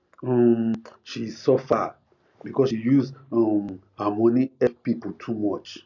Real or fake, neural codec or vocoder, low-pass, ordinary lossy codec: real; none; 7.2 kHz; none